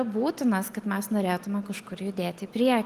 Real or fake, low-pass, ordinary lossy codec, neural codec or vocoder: real; 14.4 kHz; Opus, 24 kbps; none